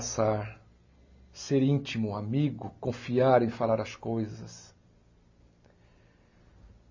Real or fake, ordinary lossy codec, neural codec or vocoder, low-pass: real; MP3, 32 kbps; none; 7.2 kHz